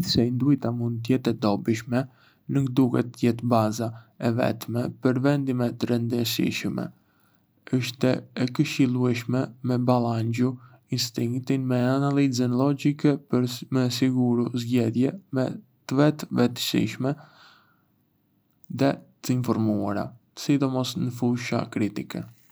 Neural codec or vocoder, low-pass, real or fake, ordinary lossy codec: none; none; real; none